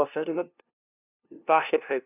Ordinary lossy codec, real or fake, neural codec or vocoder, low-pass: none; fake; codec, 16 kHz, 0.5 kbps, FunCodec, trained on LibriTTS, 25 frames a second; 3.6 kHz